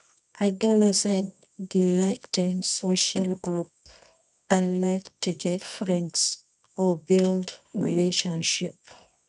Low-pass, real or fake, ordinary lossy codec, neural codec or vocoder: 10.8 kHz; fake; none; codec, 24 kHz, 0.9 kbps, WavTokenizer, medium music audio release